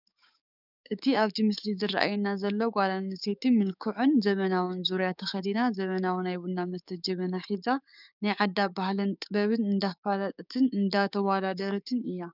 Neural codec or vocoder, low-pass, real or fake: codec, 16 kHz, 6 kbps, DAC; 5.4 kHz; fake